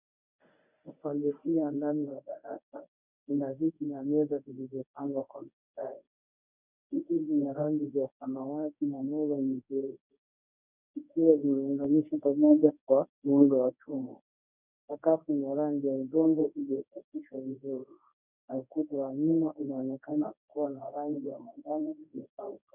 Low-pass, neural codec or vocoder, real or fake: 3.6 kHz; codec, 24 kHz, 0.9 kbps, WavTokenizer, medium speech release version 1; fake